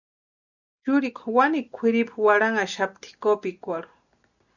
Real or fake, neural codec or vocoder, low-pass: real; none; 7.2 kHz